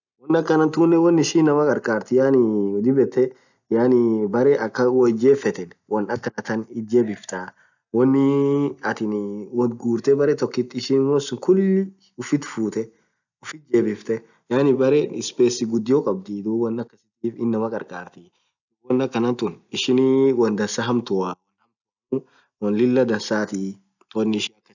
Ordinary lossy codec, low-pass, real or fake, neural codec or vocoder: none; 7.2 kHz; real; none